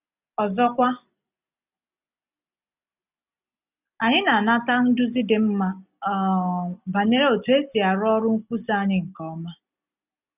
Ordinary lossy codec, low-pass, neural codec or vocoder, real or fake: none; 3.6 kHz; none; real